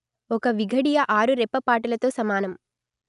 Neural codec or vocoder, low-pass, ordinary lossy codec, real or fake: none; 10.8 kHz; none; real